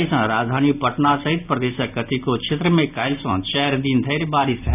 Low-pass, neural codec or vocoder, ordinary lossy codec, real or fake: 3.6 kHz; none; none; real